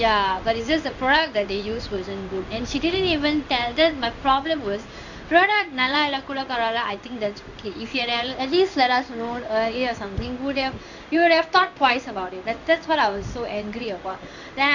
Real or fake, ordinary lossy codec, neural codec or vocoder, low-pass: fake; none; codec, 16 kHz in and 24 kHz out, 1 kbps, XY-Tokenizer; 7.2 kHz